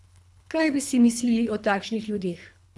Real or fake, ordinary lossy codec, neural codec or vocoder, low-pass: fake; none; codec, 24 kHz, 3 kbps, HILCodec; none